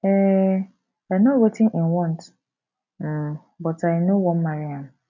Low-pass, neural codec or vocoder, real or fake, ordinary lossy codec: 7.2 kHz; none; real; none